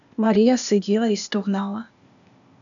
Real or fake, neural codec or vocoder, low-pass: fake; codec, 16 kHz, 0.8 kbps, ZipCodec; 7.2 kHz